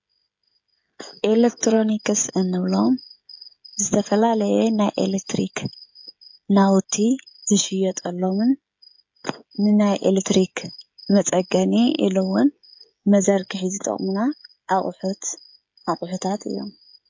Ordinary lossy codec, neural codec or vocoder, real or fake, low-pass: MP3, 48 kbps; codec, 16 kHz, 16 kbps, FreqCodec, smaller model; fake; 7.2 kHz